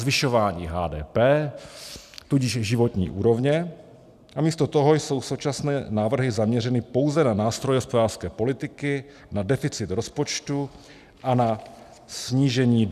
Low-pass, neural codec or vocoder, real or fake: 14.4 kHz; none; real